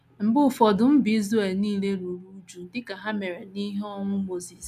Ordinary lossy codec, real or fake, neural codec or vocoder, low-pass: none; real; none; 14.4 kHz